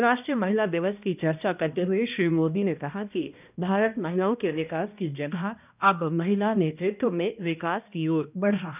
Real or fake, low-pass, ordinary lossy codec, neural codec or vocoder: fake; 3.6 kHz; none; codec, 16 kHz, 1 kbps, X-Codec, HuBERT features, trained on balanced general audio